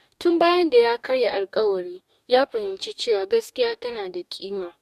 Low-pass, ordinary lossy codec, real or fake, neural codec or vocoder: 14.4 kHz; MP3, 96 kbps; fake; codec, 44.1 kHz, 2.6 kbps, DAC